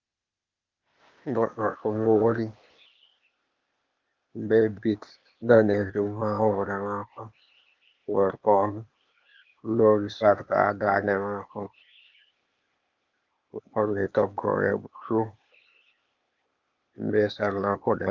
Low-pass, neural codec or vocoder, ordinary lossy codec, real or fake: 7.2 kHz; codec, 16 kHz, 0.8 kbps, ZipCodec; Opus, 24 kbps; fake